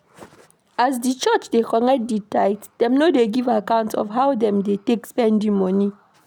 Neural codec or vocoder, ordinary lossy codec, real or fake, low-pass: none; none; real; 19.8 kHz